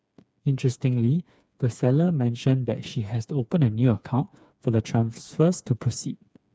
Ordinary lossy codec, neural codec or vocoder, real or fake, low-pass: none; codec, 16 kHz, 4 kbps, FreqCodec, smaller model; fake; none